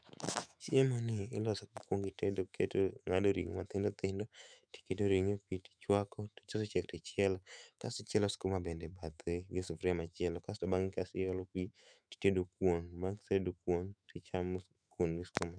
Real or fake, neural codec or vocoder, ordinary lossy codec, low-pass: fake; autoencoder, 48 kHz, 128 numbers a frame, DAC-VAE, trained on Japanese speech; none; 9.9 kHz